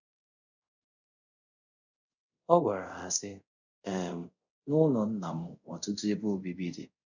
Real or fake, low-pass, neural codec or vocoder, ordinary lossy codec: fake; 7.2 kHz; codec, 24 kHz, 0.5 kbps, DualCodec; none